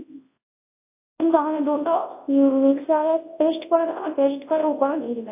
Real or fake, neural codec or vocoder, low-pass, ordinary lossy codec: fake; codec, 24 kHz, 0.9 kbps, WavTokenizer, large speech release; 3.6 kHz; AAC, 24 kbps